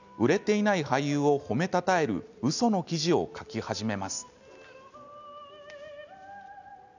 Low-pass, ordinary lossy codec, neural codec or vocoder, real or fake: 7.2 kHz; none; none; real